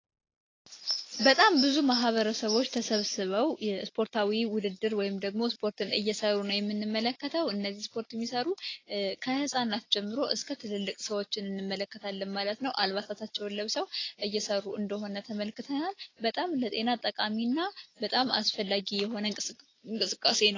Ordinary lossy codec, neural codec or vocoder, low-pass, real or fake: AAC, 32 kbps; none; 7.2 kHz; real